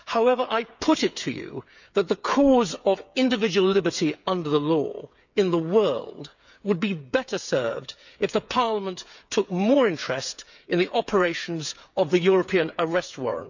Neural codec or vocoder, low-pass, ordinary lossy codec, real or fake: codec, 16 kHz, 8 kbps, FreqCodec, smaller model; 7.2 kHz; none; fake